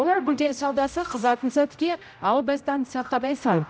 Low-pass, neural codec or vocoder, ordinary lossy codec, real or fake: none; codec, 16 kHz, 0.5 kbps, X-Codec, HuBERT features, trained on general audio; none; fake